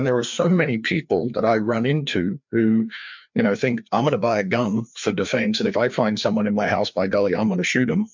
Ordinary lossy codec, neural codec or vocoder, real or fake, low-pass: MP3, 64 kbps; codec, 16 kHz, 2 kbps, FreqCodec, larger model; fake; 7.2 kHz